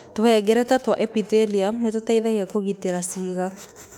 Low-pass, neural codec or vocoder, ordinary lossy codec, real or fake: 19.8 kHz; autoencoder, 48 kHz, 32 numbers a frame, DAC-VAE, trained on Japanese speech; none; fake